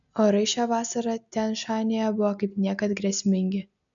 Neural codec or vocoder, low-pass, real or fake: none; 7.2 kHz; real